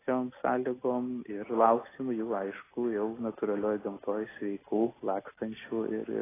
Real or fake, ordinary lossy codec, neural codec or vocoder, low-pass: real; AAC, 16 kbps; none; 3.6 kHz